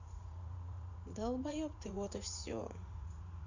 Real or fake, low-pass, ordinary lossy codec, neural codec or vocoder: fake; 7.2 kHz; none; vocoder, 44.1 kHz, 80 mel bands, Vocos